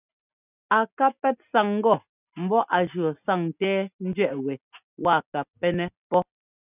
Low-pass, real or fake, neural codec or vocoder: 3.6 kHz; real; none